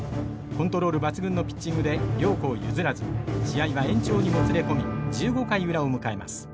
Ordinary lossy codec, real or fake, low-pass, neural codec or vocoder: none; real; none; none